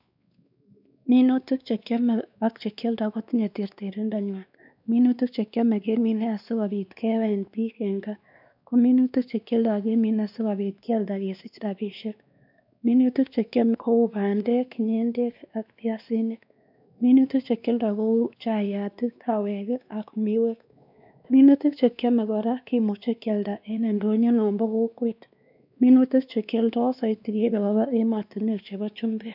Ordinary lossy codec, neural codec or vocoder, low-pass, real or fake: none; codec, 16 kHz, 2 kbps, X-Codec, WavLM features, trained on Multilingual LibriSpeech; 5.4 kHz; fake